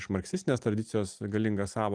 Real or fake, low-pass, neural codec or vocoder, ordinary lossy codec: real; 9.9 kHz; none; Opus, 24 kbps